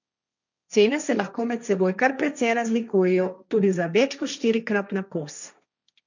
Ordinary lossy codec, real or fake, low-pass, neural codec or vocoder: none; fake; 7.2 kHz; codec, 16 kHz, 1.1 kbps, Voila-Tokenizer